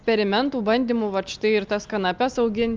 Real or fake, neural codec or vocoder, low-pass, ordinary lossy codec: real; none; 7.2 kHz; Opus, 24 kbps